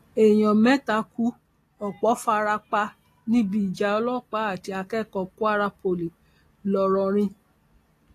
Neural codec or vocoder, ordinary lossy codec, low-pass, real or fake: none; AAC, 48 kbps; 14.4 kHz; real